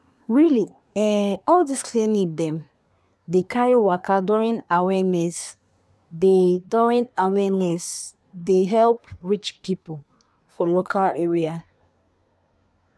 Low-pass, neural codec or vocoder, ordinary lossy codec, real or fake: none; codec, 24 kHz, 1 kbps, SNAC; none; fake